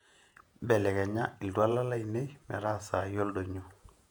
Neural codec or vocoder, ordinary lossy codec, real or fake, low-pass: vocoder, 48 kHz, 128 mel bands, Vocos; none; fake; 19.8 kHz